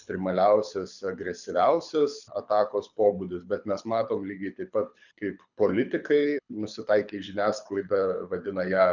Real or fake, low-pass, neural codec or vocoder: fake; 7.2 kHz; codec, 24 kHz, 6 kbps, HILCodec